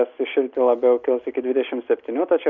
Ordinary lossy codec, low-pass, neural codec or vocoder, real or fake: Opus, 64 kbps; 7.2 kHz; none; real